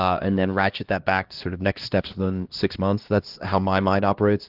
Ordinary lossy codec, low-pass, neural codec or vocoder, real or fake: Opus, 16 kbps; 5.4 kHz; codec, 16 kHz, 1 kbps, X-Codec, HuBERT features, trained on LibriSpeech; fake